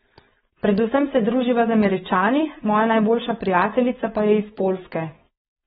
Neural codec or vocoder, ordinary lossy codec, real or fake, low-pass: codec, 16 kHz, 4.8 kbps, FACodec; AAC, 16 kbps; fake; 7.2 kHz